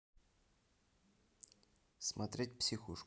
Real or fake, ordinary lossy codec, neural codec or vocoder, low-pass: real; none; none; none